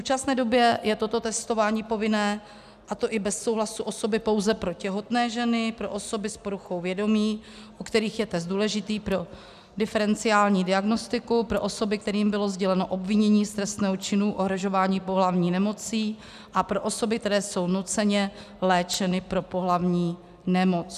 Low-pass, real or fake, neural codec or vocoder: 14.4 kHz; real; none